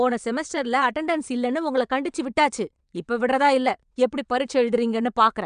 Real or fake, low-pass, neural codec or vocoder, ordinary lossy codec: fake; 9.9 kHz; vocoder, 22.05 kHz, 80 mel bands, WaveNeXt; none